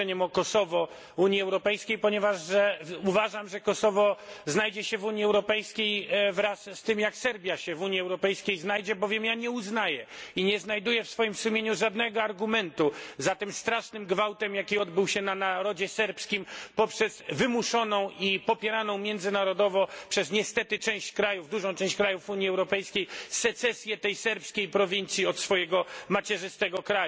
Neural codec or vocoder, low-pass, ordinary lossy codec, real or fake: none; none; none; real